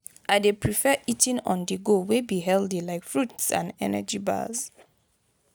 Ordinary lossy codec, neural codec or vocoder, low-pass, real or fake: none; none; none; real